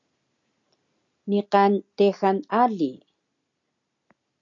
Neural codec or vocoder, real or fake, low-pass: none; real; 7.2 kHz